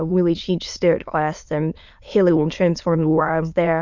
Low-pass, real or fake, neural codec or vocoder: 7.2 kHz; fake; autoencoder, 22.05 kHz, a latent of 192 numbers a frame, VITS, trained on many speakers